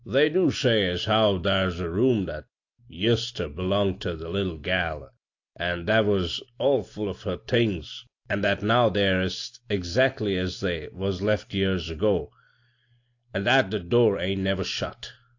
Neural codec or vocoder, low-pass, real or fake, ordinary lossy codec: none; 7.2 kHz; real; AAC, 48 kbps